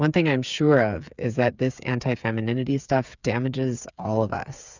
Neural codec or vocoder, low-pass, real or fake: codec, 16 kHz, 8 kbps, FreqCodec, smaller model; 7.2 kHz; fake